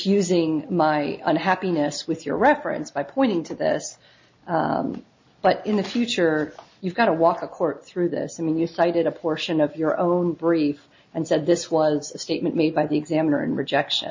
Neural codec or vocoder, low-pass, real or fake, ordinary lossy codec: none; 7.2 kHz; real; MP3, 32 kbps